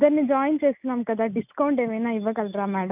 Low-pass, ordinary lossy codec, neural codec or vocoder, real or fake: 3.6 kHz; none; none; real